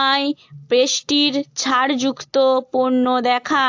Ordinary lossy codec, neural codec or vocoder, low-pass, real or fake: AAC, 48 kbps; none; 7.2 kHz; real